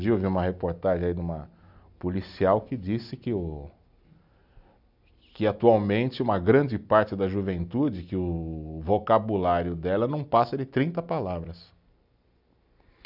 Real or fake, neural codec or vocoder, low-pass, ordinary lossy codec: real; none; 5.4 kHz; none